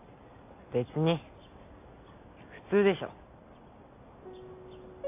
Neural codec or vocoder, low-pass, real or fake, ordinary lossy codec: none; 3.6 kHz; real; MP3, 24 kbps